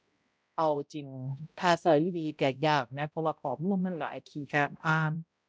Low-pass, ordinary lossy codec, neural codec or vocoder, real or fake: none; none; codec, 16 kHz, 0.5 kbps, X-Codec, HuBERT features, trained on balanced general audio; fake